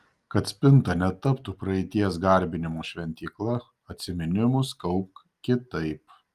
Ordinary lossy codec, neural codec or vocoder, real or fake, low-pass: Opus, 32 kbps; none; real; 14.4 kHz